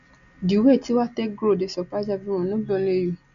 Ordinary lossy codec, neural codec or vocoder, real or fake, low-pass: none; none; real; 7.2 kHz